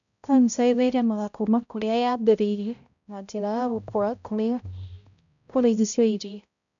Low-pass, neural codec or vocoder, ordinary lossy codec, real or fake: 7.2 kHz; codec, 16 kHz, 0.5 kbps, X-Codec, HuBERT features, trained on balanced general audio; none; fake